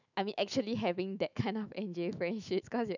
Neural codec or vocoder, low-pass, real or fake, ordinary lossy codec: autoencoder, 48 kHz, 128 numbers a frame, DAC-VAE, trained on Japanese speech; 7.2 kHz; fake; none